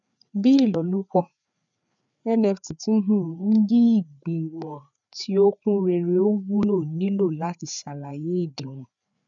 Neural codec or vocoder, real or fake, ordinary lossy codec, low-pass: codec, 16 kHz, 4 kbps, FreqCodec, larger model; fake; none; 7.2 kHz